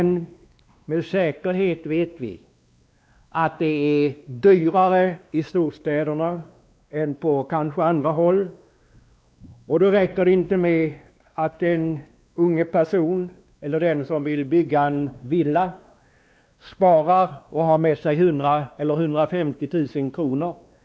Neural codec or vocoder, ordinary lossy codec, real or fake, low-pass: codec, 16 kHz, 2 kbps, X-Codec, WavLM features, trained on Multilingual LibriSpeech; none; fake; none